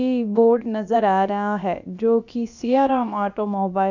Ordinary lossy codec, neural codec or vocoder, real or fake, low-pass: none; codec, 16 kHz, about 1 kbps, DyCAST, with the encoder's durations; fake; 7.2 kHz